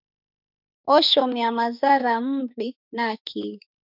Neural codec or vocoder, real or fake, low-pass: autoencoder, 48 kHz, 32 numbers a frame, DAC-VAE, trained on Japanese speech; fake; 5.4 kHz